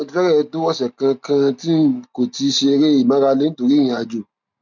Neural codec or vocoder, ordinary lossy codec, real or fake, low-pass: none; none; real; 7.2 kHz